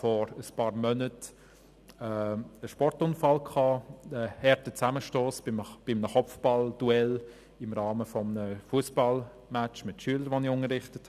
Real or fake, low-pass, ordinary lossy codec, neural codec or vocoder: real; 14.4 kHz; none; none